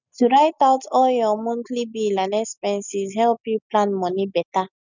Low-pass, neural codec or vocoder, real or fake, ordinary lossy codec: 7.2 kHz; none; real; none